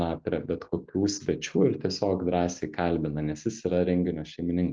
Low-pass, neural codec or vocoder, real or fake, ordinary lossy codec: 7.2 kHz; none; real; Opus, 24 kbps